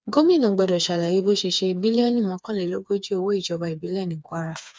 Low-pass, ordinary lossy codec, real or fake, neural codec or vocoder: none; none; fake; codec, 16 kHz, 4 kbps, FreqCodec, smaller model